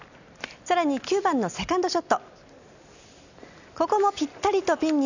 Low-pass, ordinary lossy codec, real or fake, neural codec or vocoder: 7.2 kHz; none; real; none